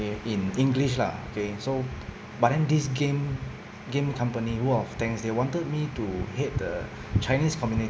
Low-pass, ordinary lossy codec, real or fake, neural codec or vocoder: none; none; real; none